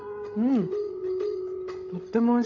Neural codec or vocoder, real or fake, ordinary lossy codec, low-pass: codec, 16 kHz, 16 kbps, FreqCodec, larger model; fake; none; 7.2 kHz